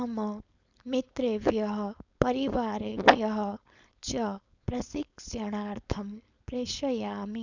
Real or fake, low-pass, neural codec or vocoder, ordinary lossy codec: fake; 7.2 kHz; codec, 16 kHz, 4.8 kbps, FACodec; none